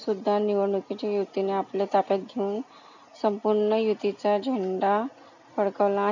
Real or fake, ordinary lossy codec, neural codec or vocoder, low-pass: real; none; none; 7.2 kHz